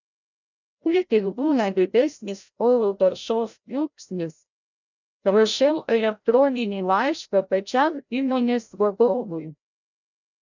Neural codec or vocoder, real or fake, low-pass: codec, 16 kHz, 0.5 kbps, FreqCodec, larger model; fake; 7.2 kHz